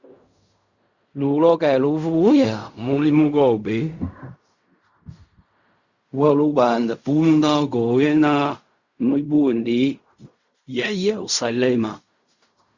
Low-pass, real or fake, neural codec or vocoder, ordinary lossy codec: 7.2 kHz; fake; codec, 16 kHz in and 24 kHz out, 0.4 kbps, LongCat-Audio-Codec, fine tuned four codebook decoder; Opus, 64 kbps